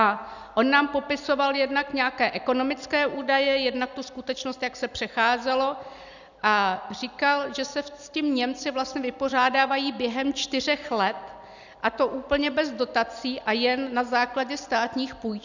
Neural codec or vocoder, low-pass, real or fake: none; 7.2 kHz; real